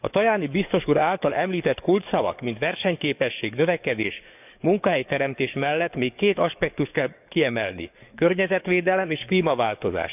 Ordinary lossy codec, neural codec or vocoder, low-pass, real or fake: none; codec, 44.1 kHz, 7.8 kbps, DAC; 3.6 kHz; fake